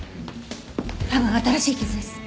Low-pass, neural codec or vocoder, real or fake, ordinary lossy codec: none; none; real; none